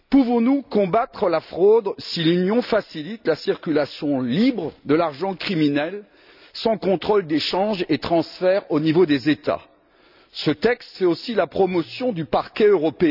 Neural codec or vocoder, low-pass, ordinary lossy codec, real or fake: none; 5.4 kHz; none; real